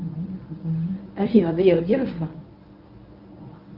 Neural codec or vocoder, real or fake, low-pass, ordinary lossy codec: codec, 24 kHz, 0.9 kbps, WavTokenizer, medium speech release version 1; fake; 5.4 kHz; Opus, 32 kbps